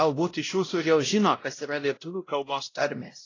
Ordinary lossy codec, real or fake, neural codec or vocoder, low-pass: AAC, 32 kbps; fake; codec, 16 kHz, 0.5 kbps, X-Codec, WavLM features, trained on Multilingual LibriSpeech; 7.2 kHz